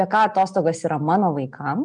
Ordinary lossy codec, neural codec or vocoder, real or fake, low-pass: MP3, 96 kbps; none; real; 9.9 kHz